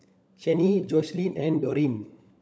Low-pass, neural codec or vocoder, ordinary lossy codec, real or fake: none; codec, 16 kHz, 16 kbps, FunCodec, trained on LibriTTS, 50 frames a second; none; fake